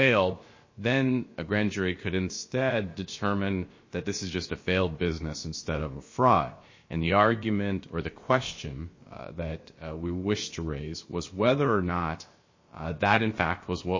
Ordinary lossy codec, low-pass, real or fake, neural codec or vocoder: MP3, 32 kbps; 7.2 kHz; fake; codec, 16 kHz, about 1 kbps, DyCAST, with the encoder's durations